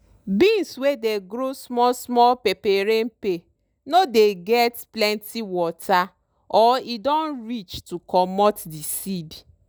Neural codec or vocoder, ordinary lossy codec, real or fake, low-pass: none; none; real; none